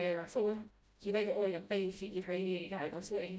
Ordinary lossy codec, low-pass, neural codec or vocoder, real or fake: none; none; codec, 16 kHz, 0.5 kbps, FreqCodec, smaller model; fake